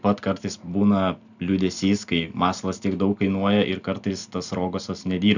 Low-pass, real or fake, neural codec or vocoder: 7.2 kHz; real; none